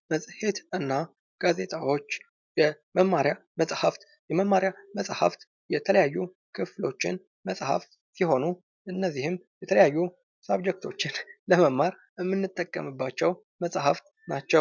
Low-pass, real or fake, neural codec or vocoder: 7.2 kHz; real; none